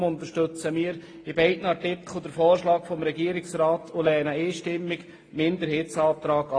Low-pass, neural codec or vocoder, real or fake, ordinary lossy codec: 9.9 kHz; none; real; AAC, 32 kbps